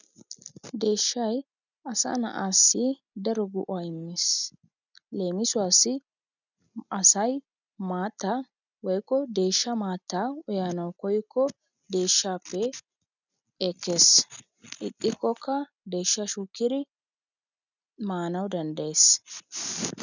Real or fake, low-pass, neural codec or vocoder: real; 7.2 kHz; none